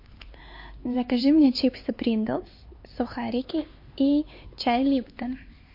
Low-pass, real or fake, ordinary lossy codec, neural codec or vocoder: 5.4 kHz; fake; MP3, 32 kbps; codec, 16 kHz, 4 kbps, X-Codec, HuBERT features, trained on LibriSpeech